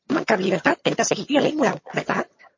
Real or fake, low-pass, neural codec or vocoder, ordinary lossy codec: fake; 7.2 kHz; vocoder, 22.05 kHz, 80 mel bands, HiFi-GAN; MP3, 32 kbps